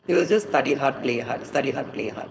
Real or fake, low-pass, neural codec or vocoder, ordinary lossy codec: fake; none; codec, 16 kHz, 4.8 kbps, FACodec; none